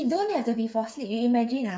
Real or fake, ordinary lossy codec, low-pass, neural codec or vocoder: fake; none; none; codec, 16 kHz, 8 kbps, FreqCodec, smaller model